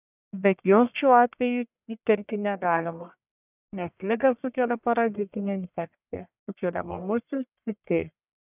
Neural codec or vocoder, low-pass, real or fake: codec, 44.1 kHz, 1.7 kbps, Pupu-Codec; 3.6 kHz; fake